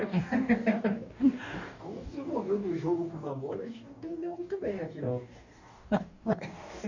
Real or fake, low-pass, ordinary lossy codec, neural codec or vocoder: fake; 7.2 kHz; none; codec, 44.1 kHz, 2.6 kbps, DAC